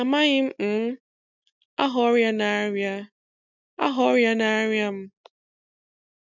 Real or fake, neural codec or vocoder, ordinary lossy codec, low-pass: real; none; none; 7.2 kHz